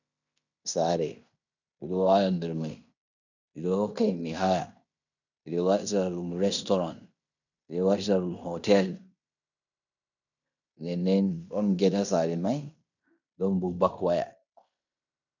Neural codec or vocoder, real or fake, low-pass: codec, 16 kHz in and 24 kHz out, 0.9 kbps, LongCat-Audio-Codec, fine tuned four codebook decoder; fake; 7.2 kHz